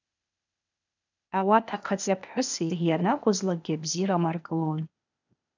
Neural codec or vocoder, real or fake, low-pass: codec, 16 kHz, 0.8 kbps, ZipCodec; fake; 7.2 kHz